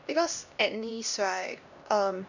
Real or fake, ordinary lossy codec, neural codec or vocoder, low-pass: fake; none; codec, 16 kHz, 1 kbps, X-Codec, HuBERT features, trained on LibriSpeech; 7.2 kHz